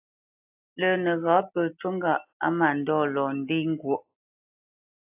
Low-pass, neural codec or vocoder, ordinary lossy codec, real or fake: 3.6 kHz; none; AAC, 32 kbps; real